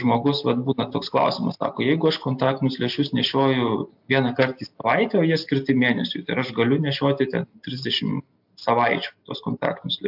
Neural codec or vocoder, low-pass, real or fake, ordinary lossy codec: none; 5.4 kHz; real; AAC, 48 kbps